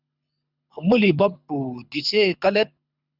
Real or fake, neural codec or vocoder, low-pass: fake; codec, 24 kHz, 6 kbps, HILCodec; 5.4 kHz